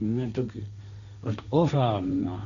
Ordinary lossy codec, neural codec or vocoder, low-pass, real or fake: none; codec, 16 kHz, 1.1 kbps, Voila-Tokenizer; 7.2 kHz; fake